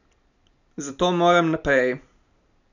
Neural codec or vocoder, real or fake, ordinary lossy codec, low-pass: none; real; none; 7.2 kHz